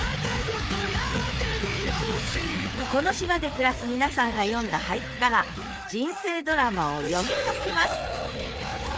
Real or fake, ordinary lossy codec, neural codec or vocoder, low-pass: fake; none; codec, 16 kHz, 4 kbps, FreqCodec, larger model; none